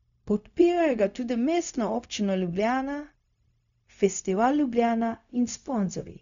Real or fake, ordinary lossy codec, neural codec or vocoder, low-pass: fake; none; codec, 16 kHz, 0.4 kbps, LongCat-Audio-Codec; 7.2 kHz